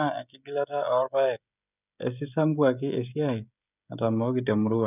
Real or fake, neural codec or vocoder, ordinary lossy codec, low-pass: fake; codec, 16 kHz, 16 kbps, FreqCodec, smaller model; none; 3.6 kHz